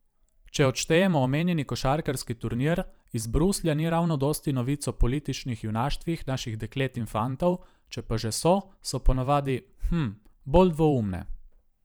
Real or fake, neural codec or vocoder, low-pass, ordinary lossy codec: fake; vocoder, 44.1 kHz, 128 mel bands every 512 samples, BigVGAN v2; none; none